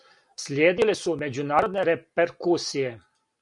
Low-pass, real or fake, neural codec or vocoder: 10.8 kHz; real; none